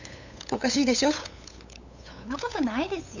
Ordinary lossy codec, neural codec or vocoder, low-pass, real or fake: none; codec, 16 kHz, 8 kbps, FunCodec, trained on LibriTTS, 25 frames a second; 7.2 kHz; fake